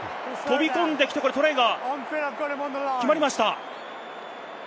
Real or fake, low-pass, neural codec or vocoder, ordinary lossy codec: real; none; none; none